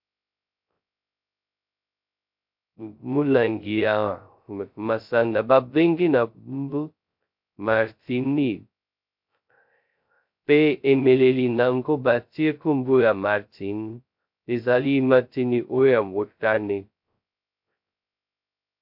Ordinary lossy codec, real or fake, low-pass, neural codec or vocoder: MP3, 48 kbps; fake; 5.4 kHz; codec, 16 kHz, 0.2 kbps, FocalCodec